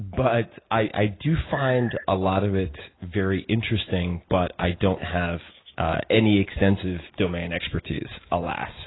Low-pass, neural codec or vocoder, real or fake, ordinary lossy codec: 7.2 kHz; none; real; AAC, 16 kbps